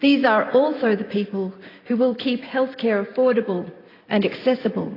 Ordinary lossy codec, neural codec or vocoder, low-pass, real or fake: AAC, 24 kbps; none; 5.4 kHz; real